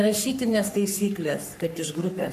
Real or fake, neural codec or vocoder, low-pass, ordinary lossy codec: fake; codec, 44.1 kHz, 3.4 kbps, Pupu-Codec; 14.4 kHz; AAC, 96 kbps